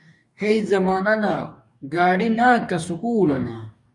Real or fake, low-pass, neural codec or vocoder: fake; 10.8 kHz; codec, 44.1 kHz, 2.6 kbps, DAC